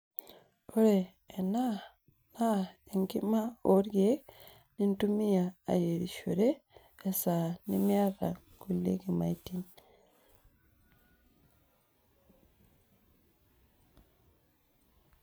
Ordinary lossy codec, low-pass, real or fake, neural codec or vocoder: none; none; real; none